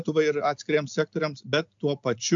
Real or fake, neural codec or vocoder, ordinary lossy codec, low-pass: real; none; AAC, 64 kbps; 7.2 kHz